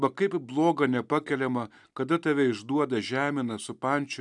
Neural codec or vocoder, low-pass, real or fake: none; 10.8 kHz; real